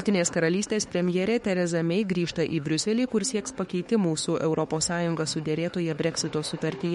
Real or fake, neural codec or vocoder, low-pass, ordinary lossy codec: fake; autoencoder, 48 kHz, 32 numbers a frame, DAC-VAE, trained on Japanese speech; 19.8 kHz; MP3, 48 kbps